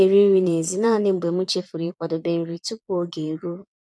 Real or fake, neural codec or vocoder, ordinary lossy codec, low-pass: fake; vocoder, 22.05 kHz, 80 mel bands, Vocos; none; none